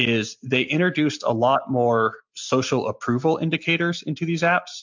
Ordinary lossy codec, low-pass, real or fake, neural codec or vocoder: MP3, 64 kbps; 7.2 kHz; real; none